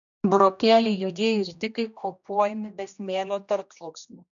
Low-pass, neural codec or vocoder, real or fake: 7.2 kHz; codec, 16 kHz, 1 kbps, X-Codec, HuBERT features, trained on general audio; fake